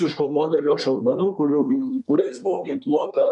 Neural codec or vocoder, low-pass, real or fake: codec, 24 kHz, 1 kbps, SNAC; 10.8 kHz; fake